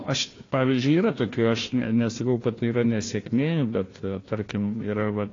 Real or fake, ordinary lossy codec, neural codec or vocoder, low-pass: fake; AAC, 32 kbps; codec, 16 kHz, 1 kbps, FunCodec, trained on Chinese and English, 50 frames a second; 7.2 kHz